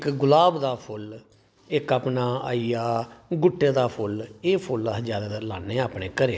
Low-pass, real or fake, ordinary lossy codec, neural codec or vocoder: none; real; none; none